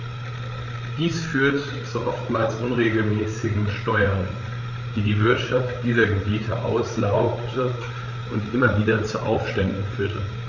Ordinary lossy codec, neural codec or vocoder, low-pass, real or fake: none; codec, 16 kHz, 8 kbps, FreqCodec, larger model; 7.2 kHz; fake